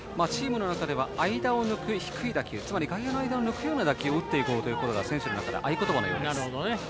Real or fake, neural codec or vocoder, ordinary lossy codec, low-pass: real; none; none; none